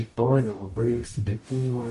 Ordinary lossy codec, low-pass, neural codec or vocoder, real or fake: MP3, 48 kbps; 14.4 kHz; codec, 44.1 kHz, 0.9 kbps, DAC; fake